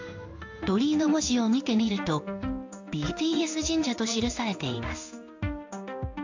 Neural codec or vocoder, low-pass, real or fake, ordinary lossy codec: codec, 16 kHz in and 24 kHz out, 1 kbps, XY-Tokenizer; 7.2 kHz; fake; AAC, 48 kbps